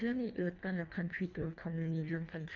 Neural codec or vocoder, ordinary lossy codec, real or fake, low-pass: codec, 24 kHz, 1.5 kbps, HILCodec; none; fake; 7.2 kHz